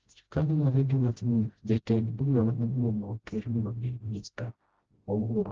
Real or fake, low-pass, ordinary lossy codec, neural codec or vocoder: fake; 7.2 kHz; Opus, 16 kbps; codec, 16 kHz, 0.5 kbps, FreqCodec, smaller model